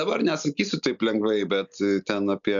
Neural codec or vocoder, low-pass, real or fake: none; 7.2 kHz; real